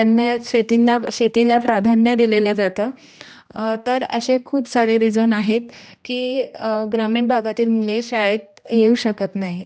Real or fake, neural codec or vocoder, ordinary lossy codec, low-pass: fake; codec, 16 kHz, 1 kbps, X-Codec, HuBERT features, trained on general audio; none; none